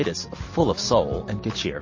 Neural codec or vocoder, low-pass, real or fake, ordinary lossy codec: none; 7.2 kHz; real; MP3, 32 kbps